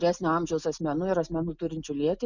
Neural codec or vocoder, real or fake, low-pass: none; real; 7.2 kHz